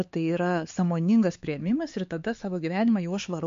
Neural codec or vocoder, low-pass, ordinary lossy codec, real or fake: codec, 16 kHz, 4 kbps, X-Codec, HuBERT features, trained on LibriSpeech; 7.2 kHz; MP3, 48 kbps; fake